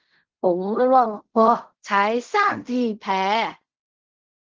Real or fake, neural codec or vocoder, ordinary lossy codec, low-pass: fake; codec, 16 kHz in and 24 kHz out, 0.4 kbps, LongCat-Audio-Codec, fine tuned four codebook decoder; Opus, 16 kbps; 7.2 kHz